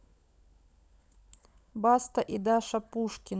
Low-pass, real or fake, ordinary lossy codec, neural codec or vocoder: none; fake; none; codec, 16 kHz, 16 kbps, FunCodec, trained on LibriTTS, 50 frames a second